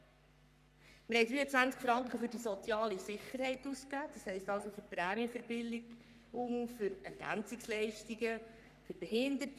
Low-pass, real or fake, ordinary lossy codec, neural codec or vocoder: 14.4 kHz; fake; none; codec, 44.1 kHz, 3.4 kbps, Pupu-Codec